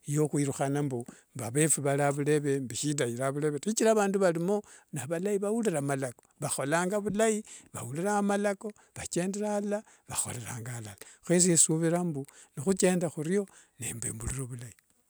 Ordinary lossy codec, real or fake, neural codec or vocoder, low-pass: none; real; none; none